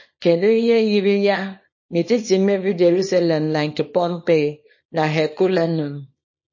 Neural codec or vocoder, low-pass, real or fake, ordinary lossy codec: codec, 24 kHz, 0.9 kbps, WavTokenizer, small release; 7.2 kHz; fake; MP3, 32 kbps